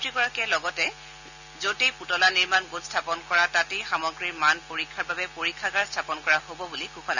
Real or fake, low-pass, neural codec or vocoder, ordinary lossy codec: real; 7.2 kHz; none; none